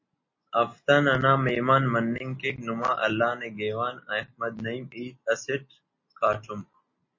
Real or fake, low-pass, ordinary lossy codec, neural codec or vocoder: real; 7.2 kHz; MP3, 32 kbps; none